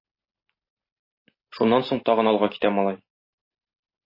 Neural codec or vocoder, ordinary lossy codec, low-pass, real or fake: none; MP3, 24 kbps; 5.4 kHz; real